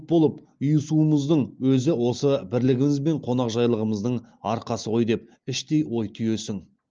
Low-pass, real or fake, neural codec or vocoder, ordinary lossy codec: 7.2 kHz; real; none; Opus, 24 kbps